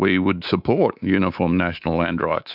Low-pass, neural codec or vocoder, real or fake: 5.4 kHz; codec, 24 kHz, 3.1 kbps, DualCodec; fake